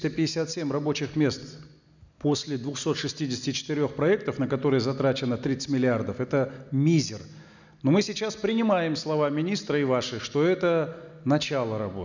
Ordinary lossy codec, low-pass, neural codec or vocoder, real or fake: none; 7.2 kHz; none; real